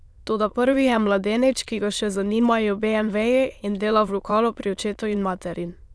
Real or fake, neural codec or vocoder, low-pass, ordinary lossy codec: fake; autoencoder, 22.05 kHz, a latent of 192 numbers a frame, VITS, trained on many speakers; none; none